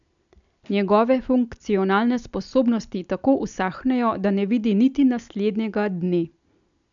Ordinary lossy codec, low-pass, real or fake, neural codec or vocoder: none; 7.2 kHz; real; none